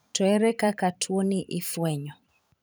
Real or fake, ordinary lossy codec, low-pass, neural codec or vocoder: fake; none; none; vocoder, 44.1 kHz, 128 mel bands every 512 samples, BigVGAN v2